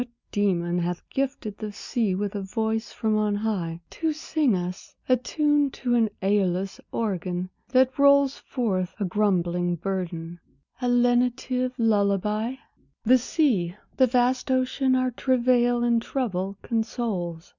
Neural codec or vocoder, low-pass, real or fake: none; 7.2 kHz; real